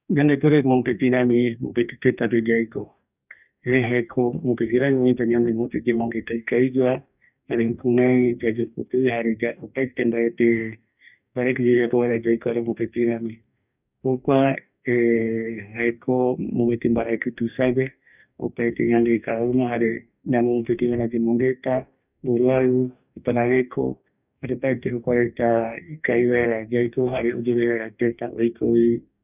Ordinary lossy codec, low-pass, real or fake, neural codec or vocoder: none; 3.6 kHz; fake; codec, 44.1 kHz, 2.6 kbps, DAC